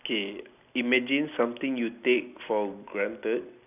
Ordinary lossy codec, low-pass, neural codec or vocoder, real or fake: none; 3.6 kHz; none; real